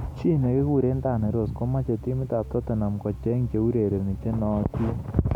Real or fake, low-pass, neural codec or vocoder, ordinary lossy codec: real; 19.8 kHz; none; MP3, 96 kbps